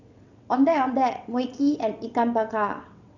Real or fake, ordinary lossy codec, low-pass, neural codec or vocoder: fake; none; 7.2 kHz; vocoder, 22.05 kHz, 80 mel bands, WaveNeXt